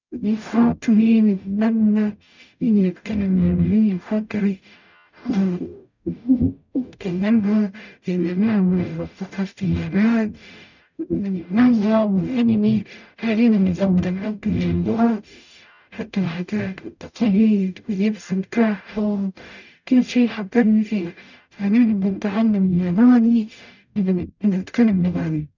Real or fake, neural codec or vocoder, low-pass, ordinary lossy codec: fake; codec, 44.1 kHz, 0.9 kbps, DAC; 7.2 kHz; none